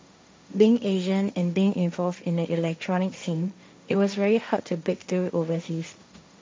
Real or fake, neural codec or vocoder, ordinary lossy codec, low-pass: fake; codec, 16 kHz, 1.1 kbps, Voila-Tokenizer; none; none